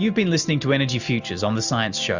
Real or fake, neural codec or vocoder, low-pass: real; none; 7.2 kHz